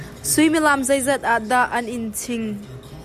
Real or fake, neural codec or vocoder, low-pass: real; none; 14.4 kHz